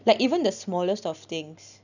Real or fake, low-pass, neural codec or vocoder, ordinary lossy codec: real; 7.2 kHz; none; none